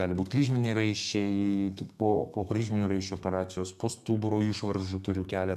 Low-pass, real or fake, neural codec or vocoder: 14.4 kHz; fake; codec, 32 kHz, 1.9 kbps, SNAC